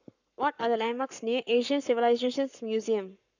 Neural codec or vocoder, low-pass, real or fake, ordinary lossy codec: codec, 44.1 kHz, 7.8 kbps, Pupu-Codec; 7.2 kHz; fake; none